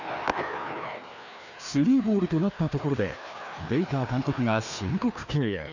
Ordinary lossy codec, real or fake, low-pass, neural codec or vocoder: none; fake; 7.2 kHz; codec, 16 kHz, 2 kbps, FreqCodec, larger model